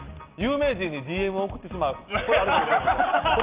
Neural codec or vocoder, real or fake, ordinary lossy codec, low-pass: none; real; Opus, 32 kbps; 3.6 kHz